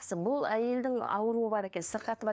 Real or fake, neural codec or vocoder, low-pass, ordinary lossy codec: fake; codec, 16 kHz, 2 kbps, FunCodec, trained on LibriTTS, 25 frames a second; none; none